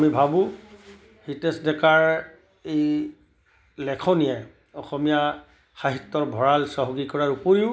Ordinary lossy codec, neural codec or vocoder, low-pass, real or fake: none; none; none; real